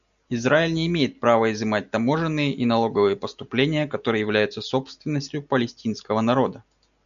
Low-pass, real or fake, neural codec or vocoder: 7.2 kHz; real; none